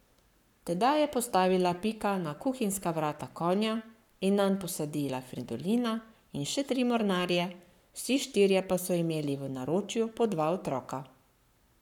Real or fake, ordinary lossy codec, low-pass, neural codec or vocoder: fake; none; 19.8 kHz; codec, 44.1 kHz, 7.8 kbps, Pupu-Codec